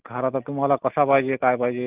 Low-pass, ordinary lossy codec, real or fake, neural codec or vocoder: 3.6 kHz; Opus, 24 kbps; real; none